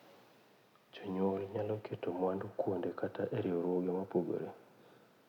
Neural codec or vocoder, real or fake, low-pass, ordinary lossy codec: none; real; 19.8 kHz; none